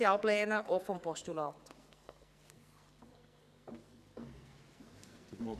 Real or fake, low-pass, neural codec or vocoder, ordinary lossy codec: fake; 14.4 kHz; codec, 44.1 kHz, 2.6 kbps, SNAC; none